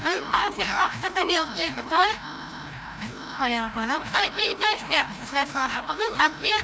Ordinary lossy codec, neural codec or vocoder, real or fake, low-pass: none; codec, 16 kHz, 0.5 kbps, FreqCodec, larger model; fake; none